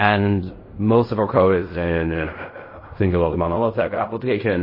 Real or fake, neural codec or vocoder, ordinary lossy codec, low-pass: fake; codec, 16 kHz in and 24 kHz out, 0.4 kbps, LongCat-Audio-Codec, fine tuned four codebook decoder; MP3, 24 kbps; 5.4 kHz